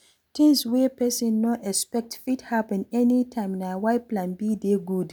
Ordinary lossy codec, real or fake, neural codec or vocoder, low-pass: none; real; none; none